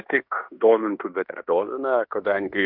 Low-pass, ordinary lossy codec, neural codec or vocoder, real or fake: 5.4 kHz; MP3, 48 kbps; codec, 16 kHz in and 24 kHz out, 0.9 kbps, LongCat-Audio-Codec, fine tuned four codebook decoder; fake